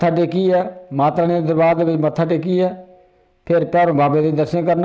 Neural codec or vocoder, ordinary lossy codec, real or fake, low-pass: none; none; real; none